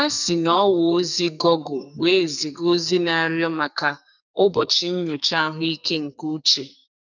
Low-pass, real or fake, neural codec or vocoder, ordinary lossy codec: 7.2 kHz; fake; codec, 44.1 kHz, 2.6 kbps, SNAC; none